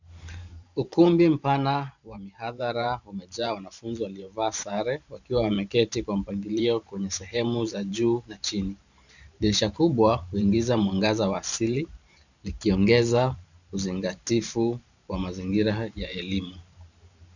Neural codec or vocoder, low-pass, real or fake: vocoder, 44.1 kHz, 128 mel bands every 512 samples, BigVGAN v2; 7.2 kHz; fake